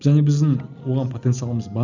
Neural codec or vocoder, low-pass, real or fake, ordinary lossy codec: none; 7.2 kHz; real; none